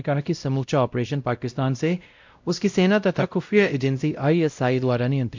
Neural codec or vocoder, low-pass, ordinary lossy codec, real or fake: codec, 16 kHz, 0.5 kbps, X-Codec, WavLM features, trained on Multilingual LibriSpeech; 7.2 kHz; MP3, 64 kbps; fake